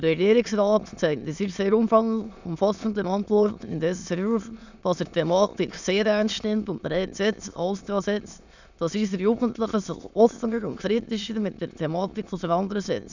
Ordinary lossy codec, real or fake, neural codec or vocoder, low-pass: none; fake; autoencoder, 22.05 kHz, a latent of 192 numbers a frame, VITS, trained on many speakers; 7.2 kHz